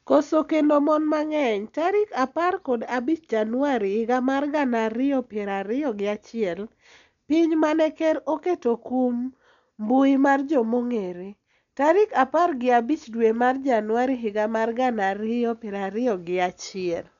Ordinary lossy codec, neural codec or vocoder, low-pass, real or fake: none; none; 7.2 kHz; real